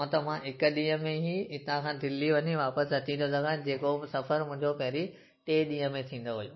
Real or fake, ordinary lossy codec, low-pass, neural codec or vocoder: real; MP3, 24 kbps; 7.2 kHz; none